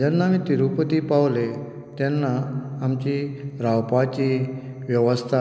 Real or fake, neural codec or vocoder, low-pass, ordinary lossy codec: real; none; none; none